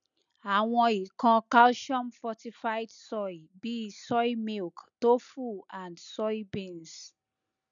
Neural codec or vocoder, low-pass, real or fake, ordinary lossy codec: none; 7.2 kHz; real; none